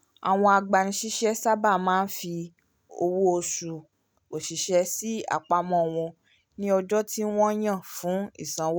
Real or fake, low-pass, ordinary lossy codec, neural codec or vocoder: real; none; none; none